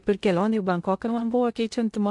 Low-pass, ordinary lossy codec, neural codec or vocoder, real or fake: 10.8 kHz; MP3, 64 kbps; codec, 16 kHz in and 24 kHz out, 0.6 kbps, FocalCodec, streaming, 2048 codes; fake